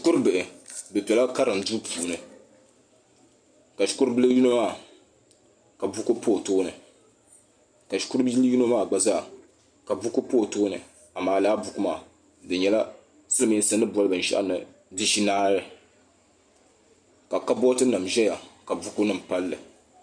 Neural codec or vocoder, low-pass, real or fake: none; 9.9 kHz; real